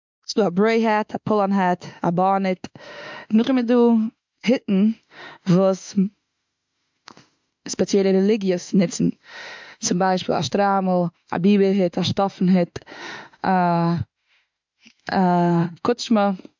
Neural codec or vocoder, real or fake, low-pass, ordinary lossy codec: autoencoder, 48 kHz, 32 numbers a frame, DAC-VAE, trained on Japanese speech; fake; 7.2 kHz; MP3, 64 kbps